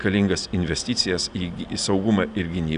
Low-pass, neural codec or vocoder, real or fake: 9.9 kHz; none; real